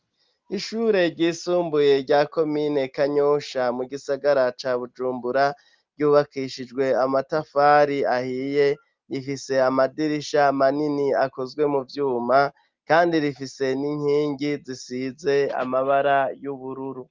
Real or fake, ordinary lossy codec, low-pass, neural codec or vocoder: real; Opus, 24 kbps; 7.2 kHz; none